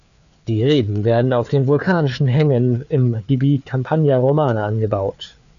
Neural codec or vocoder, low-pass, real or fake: codec, 16 kHz, 4 kbps, FreqCodec, larger model; 7.2 kHz; fake